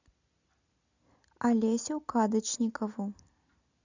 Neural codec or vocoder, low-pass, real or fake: none; 7.2 kHz; real